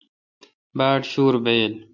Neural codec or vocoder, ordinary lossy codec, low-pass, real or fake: none; MP3, 64 kbps; 7.2 kHz; real